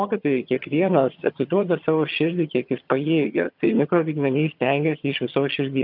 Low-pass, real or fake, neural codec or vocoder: 5.4 kHz; fake; vocoder, 22.05 kHz, 80 mel bands, HiFi-GAN